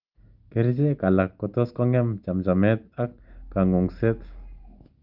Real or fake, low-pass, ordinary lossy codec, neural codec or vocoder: real; 5.4 kHz; Opus, 24 kbps; none